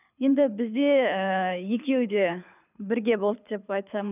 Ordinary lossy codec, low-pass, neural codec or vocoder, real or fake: none; 3.6 kHz; codec, 24 kHz, 6 kbps, HILCodec; fake